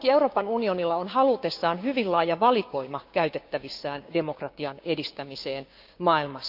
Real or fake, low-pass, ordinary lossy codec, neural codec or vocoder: fake; 5.4 kHz; none; codec, 16 kHz, 6 kbps, DAC